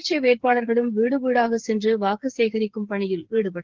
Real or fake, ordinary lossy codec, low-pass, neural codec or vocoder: fake; Opus, 16 kbps; 7.2 kHz; codec, 16 kHz, 8 kbps, FreqCodec, smaller model